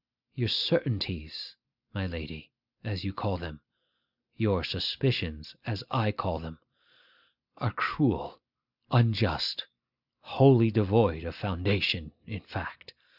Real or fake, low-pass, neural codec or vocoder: real; 5.4 kHz; none